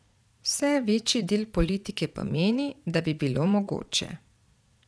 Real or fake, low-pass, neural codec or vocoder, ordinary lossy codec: fake; none; vocoder, 22.05 kHz, 80 mel bands, Vocos; none